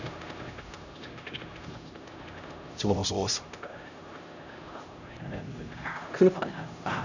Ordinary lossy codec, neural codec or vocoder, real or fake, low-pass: none; codec, 16 kHz, 0.5 kbps, X-Codec, HuBERT features, trained on LibriSpeech; fake; 7.2 kHz